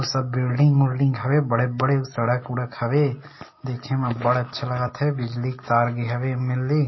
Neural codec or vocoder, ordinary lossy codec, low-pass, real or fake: none; MP3, 24 kbps; 7.2 kHz; real